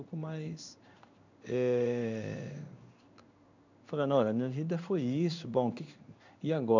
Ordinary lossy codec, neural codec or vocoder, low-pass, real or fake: none; codec, 16 kHz in and 24 kHz out, 1 kbps, XY-Tokenizer; 7.2 kHz; fake